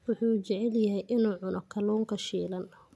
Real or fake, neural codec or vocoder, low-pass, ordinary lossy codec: real; none; none; none